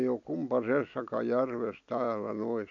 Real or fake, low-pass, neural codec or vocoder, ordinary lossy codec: real; 7.2 kHz; none; none